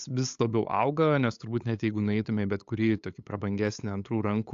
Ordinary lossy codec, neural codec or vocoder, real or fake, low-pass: AAC, 64 kbps; codec, 16 kHz, 8 kbps, FunCodec, trained on LibriTTS, 25 frames a second; fake; 7.2 kHz